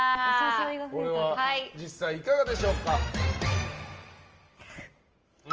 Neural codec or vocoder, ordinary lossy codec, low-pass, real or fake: none; Opus, 24 kbps; 7.2 kHz; real